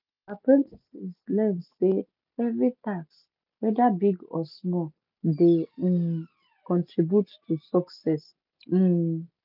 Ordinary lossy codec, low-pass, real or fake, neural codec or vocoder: none; 5.4 kHz; real; none